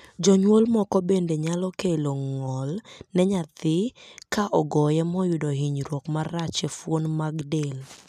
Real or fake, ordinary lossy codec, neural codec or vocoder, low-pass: real; none; none; 14.4 kHz